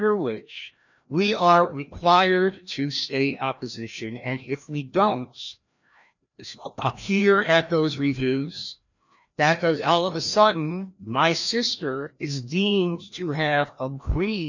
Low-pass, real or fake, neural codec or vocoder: 7.2 kHz; fake; codec, 16 kHz, 1 kbps, FreqCodec, larger model